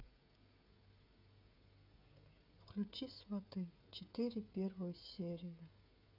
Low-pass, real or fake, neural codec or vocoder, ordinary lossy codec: 5.4 kHz; fake; codec, 16 kHz, 16 kbps, FreqCodec, smaller model; none